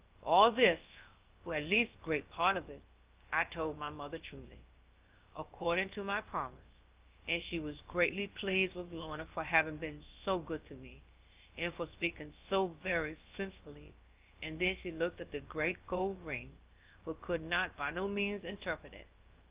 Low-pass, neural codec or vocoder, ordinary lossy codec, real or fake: 3.6 kHz; codec, 16 kHz, about 1 kbps, DyCAST, with the encoder's durations; Opus, 32 kbps; fake